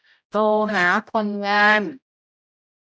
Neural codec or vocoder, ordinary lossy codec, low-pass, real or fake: codec, 16 kHz, 0.5 kbps, X-Codec, HuBERT features, trained on general audio; none; none; fake